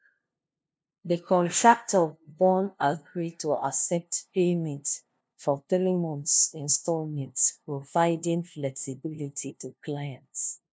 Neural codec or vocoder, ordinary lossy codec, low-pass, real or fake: codec, 16 kHz, 0.5 kbps, FunCodec, trained on LibriTTS, 25 frames a second; none; none; fake